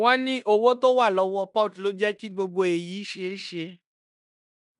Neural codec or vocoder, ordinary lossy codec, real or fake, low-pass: codec, 16 kHz in and 24 kHz out, 0.9 kbps, LongCat-Audio-Codec, fine tuned four codebook decoder; none; fake; 10.8 kHz